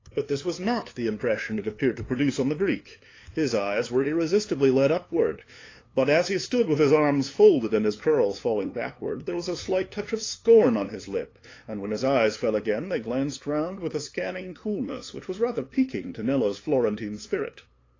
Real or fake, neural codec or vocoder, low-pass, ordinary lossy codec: fake; codec, 16 kHz, 2 kbps, FunCodec, trained on LibriTTS, 25 frames a second; 7.2 kHz; AAC, 32 kbps